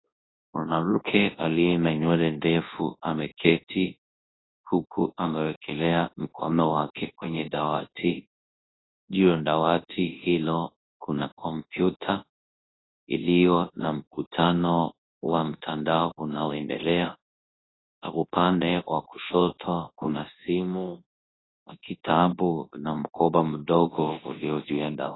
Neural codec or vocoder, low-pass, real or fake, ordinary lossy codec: codec, 24 kHz, 0.9 kbps, WavTokenizer, large speech release; 7.2 kHz; fake; AAC, 16 kbps